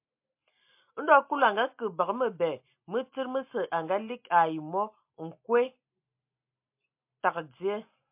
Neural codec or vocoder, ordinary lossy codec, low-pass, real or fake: none; MP3, 32 kbps; 3.6 kHz; real